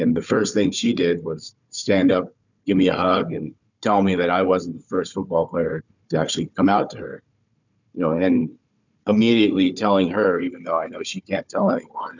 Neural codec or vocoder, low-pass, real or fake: codec, 16 kHz, 4 kbps, FunCodec, trained on Chinese and English, 50 frames a second; 7.2 kHz; fake